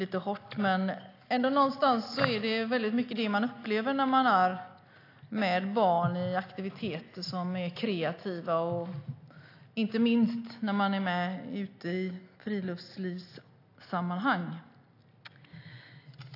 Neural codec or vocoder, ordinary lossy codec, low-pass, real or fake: none; AAC, 32 kbps; 5.4 kHz; real